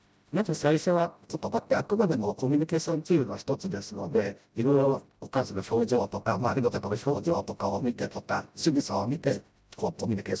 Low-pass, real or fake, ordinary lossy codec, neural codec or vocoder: none; fake; none; codec, 16 kHz, 0.5 kbps, FreqCodec, smaller model